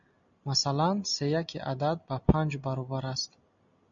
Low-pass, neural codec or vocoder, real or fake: 7.2 kHz; none; real